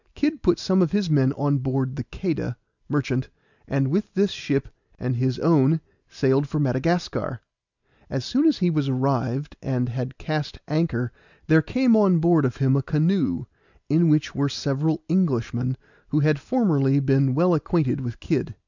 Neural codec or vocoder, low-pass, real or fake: none; 7.2 kHz; real